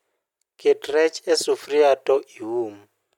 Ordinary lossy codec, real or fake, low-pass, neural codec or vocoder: MP3, 96 kbps; real; 19.8 kHz; none